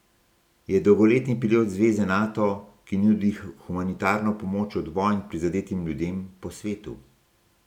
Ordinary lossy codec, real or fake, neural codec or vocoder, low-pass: none; real; none; 19.8 kHz